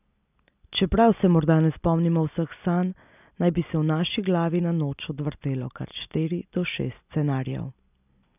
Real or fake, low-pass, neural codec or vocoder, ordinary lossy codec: real; 3.6 kHz; none; none